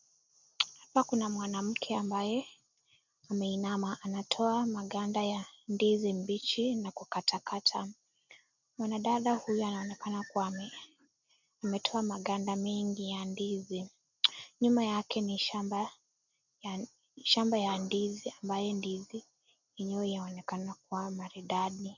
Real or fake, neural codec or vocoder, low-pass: real; none; 7.2 kHz